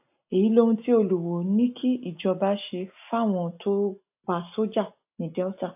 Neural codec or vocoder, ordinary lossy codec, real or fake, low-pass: none; AAC, 32 kbps; real; 3.6 kHz